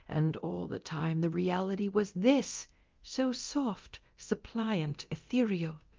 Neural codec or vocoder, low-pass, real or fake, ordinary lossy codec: codec, 24 kHz, 0.9 kbps, DualCodec; 7.2 kHz; fake; Opus, 32 kbps